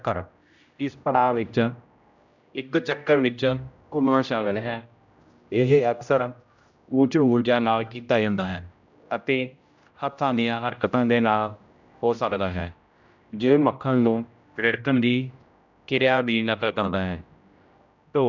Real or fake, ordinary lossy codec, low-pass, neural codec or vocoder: fake; none; 7.2 kHz; codec, 16 kHz, 0.5 kbps, X-Codec, HuBERT features, trained on general audio